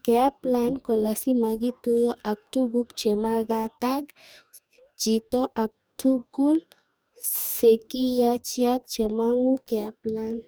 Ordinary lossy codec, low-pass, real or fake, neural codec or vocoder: none; none; fake; codec, 44.1 kHz, 2.6 kbps, DAC